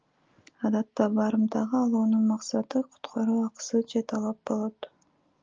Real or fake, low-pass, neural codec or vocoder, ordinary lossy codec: real; 7.2 kHz; none; Opus, 24 kbps